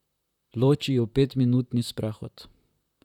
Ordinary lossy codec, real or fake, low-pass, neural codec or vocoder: none; fake; 19.8 kHz; vocoder, 44.1 kHz, 128 mel bands, Pupu-Vocoder